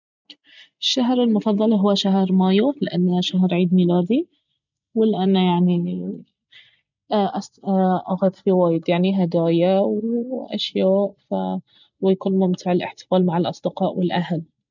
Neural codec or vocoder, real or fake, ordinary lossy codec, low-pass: none; real; none; 7.2 kHz